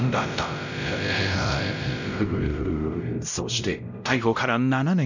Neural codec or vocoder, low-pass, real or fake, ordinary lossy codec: codec, 16 kHz, 0.5 kbps, X-Codec, WavLM features, trained on Multilingual LibriSpeech; 7.2 kHz; fake; none